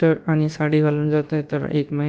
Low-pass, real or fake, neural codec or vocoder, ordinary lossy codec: none; fake; codec, 16 kHz, about 1 kbps, DyCAST, with the encoder's durations; none